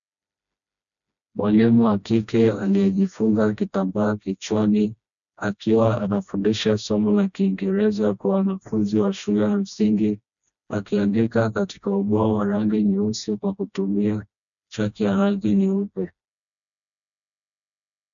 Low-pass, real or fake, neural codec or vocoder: 7.2 kHz; fake; codec, 16 kHz, 1 kbps, FreqCodec, smaller model